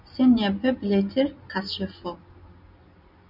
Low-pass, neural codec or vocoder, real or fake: 5.4 kHz; none; real